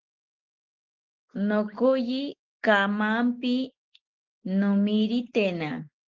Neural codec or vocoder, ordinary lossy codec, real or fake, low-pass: none; Opus, 16 kbps; real; 7.2 kHz